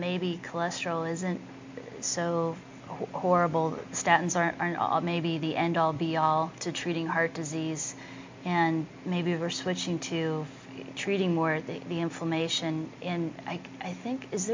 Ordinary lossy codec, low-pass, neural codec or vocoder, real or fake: MP3, 48 kbps; 7.2 kHz; none; real